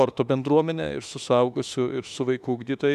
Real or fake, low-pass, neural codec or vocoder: fake; 14.4 kHz; autoencoder, 48 kHz, 32 numbers a frame, DAC-VAE, trained on Japanese speech